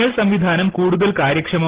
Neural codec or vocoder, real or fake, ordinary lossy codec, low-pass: none; real; Opus, 16 kbps; 3.6 kHz